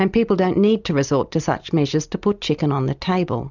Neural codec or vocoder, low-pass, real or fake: none; 7.2 kHz; real